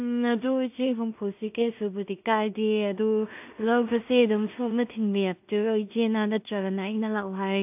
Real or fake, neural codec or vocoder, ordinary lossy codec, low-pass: fake; codec, 16 kHz in and 24 kHz out, 0.4 kbps, LongCat-Audio-Codec, two codebook decoder; AAC, 32 kbps; 3.6 kHz